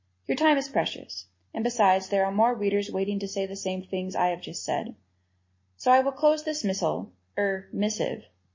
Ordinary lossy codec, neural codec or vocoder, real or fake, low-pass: MP3, 32 kbps; none; real; 7.2 kHz